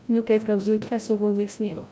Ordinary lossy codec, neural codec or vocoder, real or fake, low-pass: none; codec, 16 kHz, 0.5 kbps, FreqCodec, larger model; fake; none